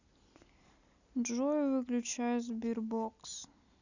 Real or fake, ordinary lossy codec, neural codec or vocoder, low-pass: real; none; none; 7.2 kHz